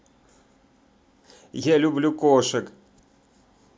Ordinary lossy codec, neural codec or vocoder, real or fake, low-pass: none; none; real; none